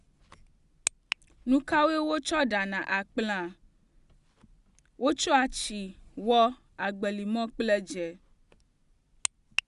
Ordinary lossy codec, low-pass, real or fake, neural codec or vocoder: Opus, 64 kbps; 10.8 kHz; real; none